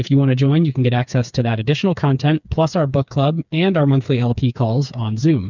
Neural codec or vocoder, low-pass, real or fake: codec, 16 kHz, 4 kbps, FreqCodec, smaller model; 7.2 kHz; fake